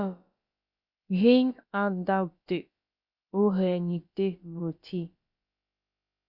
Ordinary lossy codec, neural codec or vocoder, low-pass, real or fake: Opus, 64 kbps; codec, 16 kHz, about 1 kbps, DyCAST, with the encoder's durations; 5.4 kHz; fake